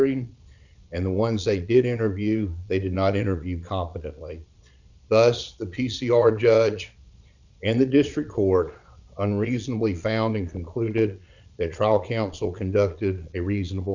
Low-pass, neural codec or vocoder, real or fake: 7.2 kHz; vocoder, 22.05 kHz, 80 mel bands, Vocos; fake